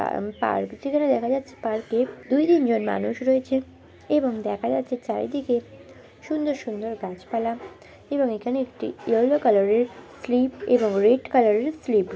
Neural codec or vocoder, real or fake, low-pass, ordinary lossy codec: none; real; none; none